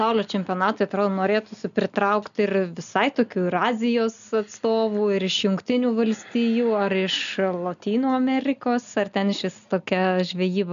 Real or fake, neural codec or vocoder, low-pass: real; none; 7.2 kHz